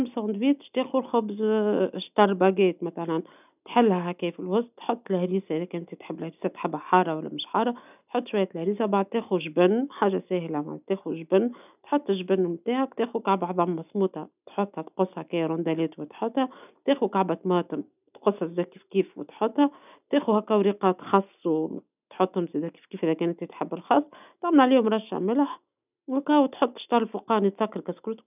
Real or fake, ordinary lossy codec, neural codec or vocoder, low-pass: real; none; none; 3.6 kHz